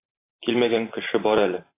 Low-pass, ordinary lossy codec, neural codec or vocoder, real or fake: 3.6 kHz; AAC, 24 kbps; vocoder, 44.1 kHz, 128 mel bands every 512 samples, BigVGAN v2; fake